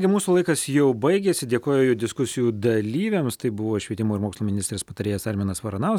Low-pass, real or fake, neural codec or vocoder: 19.8 kHz; real; none